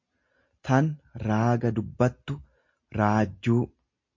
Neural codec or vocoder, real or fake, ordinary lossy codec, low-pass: none; real; MP3, 48 kbps; 7.2 kHz